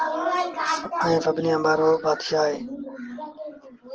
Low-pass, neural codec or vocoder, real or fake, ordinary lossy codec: 7.2 kHz; none; real; Opus, 16 kbps